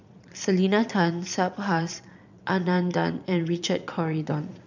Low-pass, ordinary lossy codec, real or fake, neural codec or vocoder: 7.2 kHz; none; fake; vocoder, 22.05 kHz, 80 mel bands, Vocos